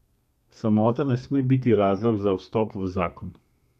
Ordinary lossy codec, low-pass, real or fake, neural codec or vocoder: none; 14.4 kHz; fake; codec, 32 kHz, 1.9 kbps, SNAC